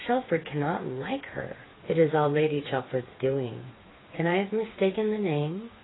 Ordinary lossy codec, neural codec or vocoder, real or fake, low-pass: AAC, 16 kbps; codec, 16 kHz, 4 kbps, FreqCodec, smaller model; fake; 7.2 kHz